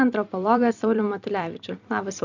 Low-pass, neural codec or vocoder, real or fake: 7.2 kHz; vocoder, 44.1 kHz, 128 mel bands every 256 samples, BigVGAN v2; fake